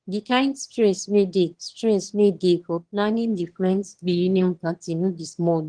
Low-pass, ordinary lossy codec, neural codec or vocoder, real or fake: 9.9 kHz; Opus, 16 kbps; autoencoder, 22.05 kHz, a latent of 192 numbers a frame, VITS, trained on one speaker; fake